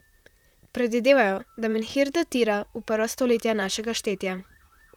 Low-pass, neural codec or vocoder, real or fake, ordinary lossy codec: 19.8 kHz; vocoder, 44.1 kHz, 128 mel bands, Pupu-Vocoder; fake; none